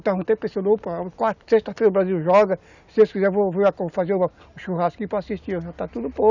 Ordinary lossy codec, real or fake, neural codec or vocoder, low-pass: none; real; none; 7.2 kHz